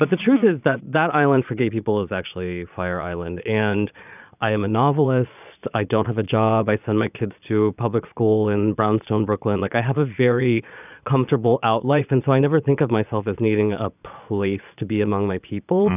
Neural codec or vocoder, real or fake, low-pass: vocoder, 22.05 kHz, 80 mel bands, Vocos; fake; 3.6 kHz